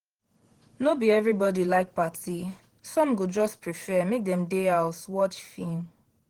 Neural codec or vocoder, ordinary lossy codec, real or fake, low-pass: vocoder, 48 kHz, 128 mel bands, Vocos; Opus, 16 kbps; fake; 19.8 kHz